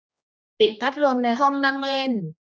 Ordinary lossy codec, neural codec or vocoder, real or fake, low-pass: none; codec, 16 kHz, 1 kbps, X-Codec, HuBERT features, trained on general audio; fake; none